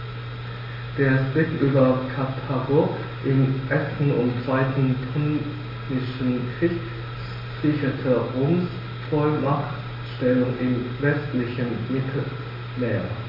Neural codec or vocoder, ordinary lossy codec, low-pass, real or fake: none; MP3, 24 kbps; 5.4 kHz; real